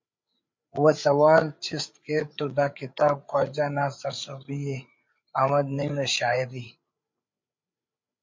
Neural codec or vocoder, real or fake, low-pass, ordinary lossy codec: codec, 16 kHz, 8 kbps, FreqCodec, larger model; fake; 7.2 kHz; MP3, 48 kbps